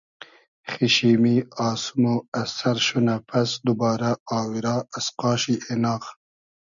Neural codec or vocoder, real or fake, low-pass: none; real; 7.2 kHz